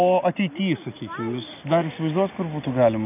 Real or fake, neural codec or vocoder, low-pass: real; none; 3.6 kHz